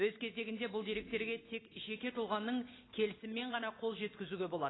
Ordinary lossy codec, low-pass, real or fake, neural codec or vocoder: AAC, 16 kbps; 7.2 kHz; real; none